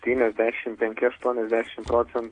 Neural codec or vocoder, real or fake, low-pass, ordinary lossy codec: none; real; 9.9 kHz; AAC, 48 kbps